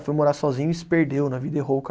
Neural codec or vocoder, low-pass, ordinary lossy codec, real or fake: none; none; none; real